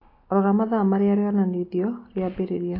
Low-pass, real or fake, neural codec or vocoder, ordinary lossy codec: 5.4 kHz; real; none; AAC, 24 kbps